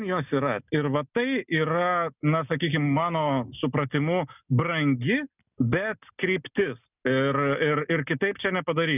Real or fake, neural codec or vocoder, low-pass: real; none; 3.6 kHz